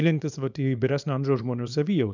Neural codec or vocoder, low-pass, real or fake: codec, 24 kHz, 0.9 kbps, WavTokenizer, small release; 7.2 kHz; fake